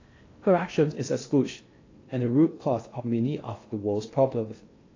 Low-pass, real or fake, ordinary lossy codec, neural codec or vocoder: 7.2 kHz; fake; AAC, 32 kbps; codec, 16 kHz in and 24 kHz out, 0.6 kbps, FocalCodec, streaming, 2048 codes